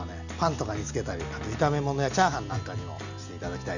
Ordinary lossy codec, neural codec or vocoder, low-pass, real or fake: none; none; 7.2 kHz; real